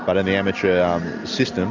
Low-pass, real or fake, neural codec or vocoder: 7.2 kHz; real; none